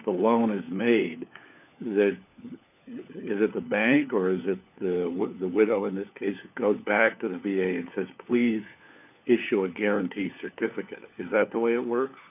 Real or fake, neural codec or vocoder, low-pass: fake; codec, 16 kHz, 4 kbps, FunCodec, trained on LibriTTS, 50 frames a second; 3.6 kHz